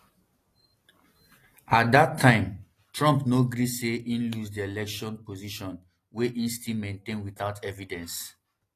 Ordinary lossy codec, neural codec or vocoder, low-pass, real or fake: AAC, 48 kbps; none; 14.4 kHz; real